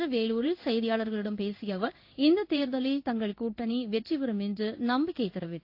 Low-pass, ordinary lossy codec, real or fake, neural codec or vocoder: 5.4 kHz; AAC, 32 kbps; fake; codec, 16 kHz in and 24 kHz out, 1 kbps, XY-Tokenizer